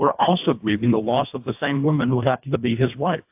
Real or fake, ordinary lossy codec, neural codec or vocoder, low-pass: fake; AAC, 32 kbps; codec, 24 kHz, 1.5 kbps, HILCodec; 3.6 kHz